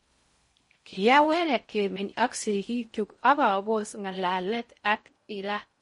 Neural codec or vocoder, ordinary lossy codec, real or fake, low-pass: codec, 16 kHz in and 24 kHz out, 0.6 kbps, FocalCodec, streaming, 4096 codes; MP3, 48 kbps; fake; 10.8 kHz